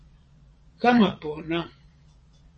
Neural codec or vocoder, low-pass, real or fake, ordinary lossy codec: vocoder, 22.05 kHz, 80 mel bands, Vocos; 9.9 kHz; fake; MP3, 32 kbps